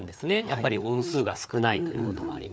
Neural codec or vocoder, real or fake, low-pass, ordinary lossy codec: codec, 16 kHz, 4 kbps, FreqCodec, larger model; fake; none; none